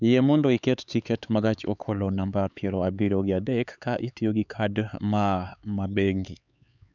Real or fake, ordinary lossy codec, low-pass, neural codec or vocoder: fake; none; 7.2 kHz; codec, 16 kHz, 4 kbps, X-Codec, HuBERT features, trained on LibriSpeech